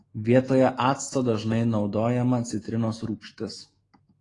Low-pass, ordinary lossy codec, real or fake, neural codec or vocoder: 10.8 kHz; AAC, 32 kbps; fake; autoencoder, 48 kHz, 128 numbers a frame, DAC-VAE, trained on Japanese speech